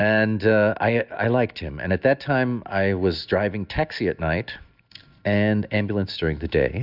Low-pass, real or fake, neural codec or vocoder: 5.4 kHz; real; none